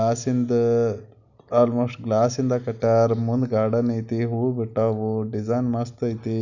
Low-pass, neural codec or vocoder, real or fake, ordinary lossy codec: 7.2 kHz; none; real; none